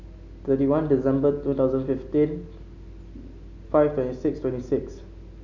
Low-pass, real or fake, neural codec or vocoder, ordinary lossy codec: 7.2 kHz; real; none; none